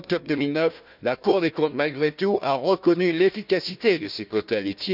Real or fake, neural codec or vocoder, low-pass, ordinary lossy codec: fake; codec, 16 kHz, 1 kbps, FunCodec, trained on Chinese and English, 50 frames a second; 5.4 kHz; none